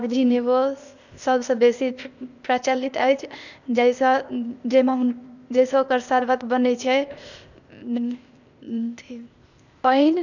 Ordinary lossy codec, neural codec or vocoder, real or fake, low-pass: none; codec, 16 kHz, 0.8 kbps, ZipCodec; fake; 7.2 kHz